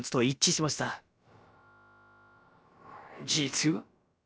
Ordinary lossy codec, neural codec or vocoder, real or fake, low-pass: none; codec, 16 kHz, about 1 kbps, DyCAST, with the encoder's durations; fake; none